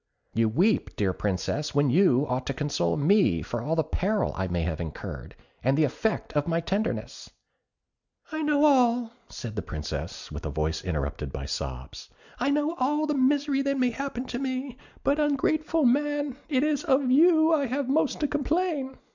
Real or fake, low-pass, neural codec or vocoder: real; 7.2 kHz; none